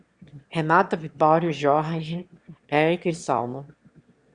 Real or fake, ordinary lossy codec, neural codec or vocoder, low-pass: fake; Opus, 64 kbps; autoencoder, 22.05 kHz, a latent of 192 numbers a frame, VITS, trained on one speaker; 9.9 kHz